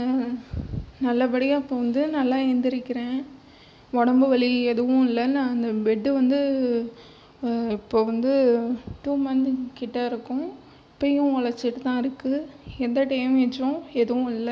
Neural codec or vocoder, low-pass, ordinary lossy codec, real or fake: none; none; none; real